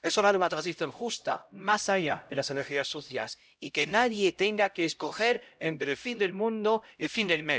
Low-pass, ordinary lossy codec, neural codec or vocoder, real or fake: none; none; codec, 16 kHz, 0.5 kbps, X-Codec, HuBERT features, trained on LibriSpeech; fake